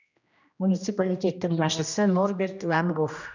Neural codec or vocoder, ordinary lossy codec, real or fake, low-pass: codec, 16 kHz, 1 kbps, X-Codec, HuBERT features, trained on general audio; none; fake; 7.2 kHz